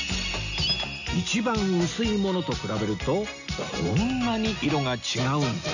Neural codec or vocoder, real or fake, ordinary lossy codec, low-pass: none; real; none; 7.2 kHz